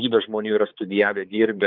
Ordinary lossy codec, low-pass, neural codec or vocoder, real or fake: Opus, 24 kbps; 5.4 kHz; codec, 16 kHz, 4 kbps, X-Codec, HuBERT features, trained on balanced general audio; fake